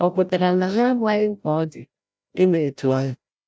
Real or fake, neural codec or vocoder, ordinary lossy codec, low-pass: fake; codec, 16 kHz, 0.5 kbps, FreqCodec, larger model; none; none